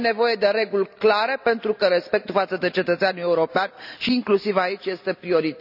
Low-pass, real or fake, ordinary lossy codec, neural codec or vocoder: 5.4 kHz; real; none; none